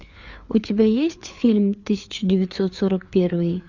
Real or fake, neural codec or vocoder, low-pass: fake; codec, 16 kHz, 4 kbps, FreqCodec, larger model; 7.2 kHz